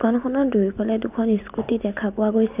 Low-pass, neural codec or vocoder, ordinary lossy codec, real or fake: 3.6 kHz; none; none; real